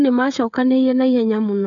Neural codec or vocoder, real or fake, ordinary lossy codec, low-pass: codec, 16 kHz, 16 kbps, FreqCodec, smaller model; fake; none; 7.2 kHz